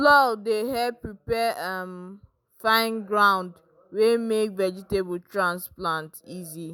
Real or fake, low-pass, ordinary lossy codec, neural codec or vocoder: real; none; none; none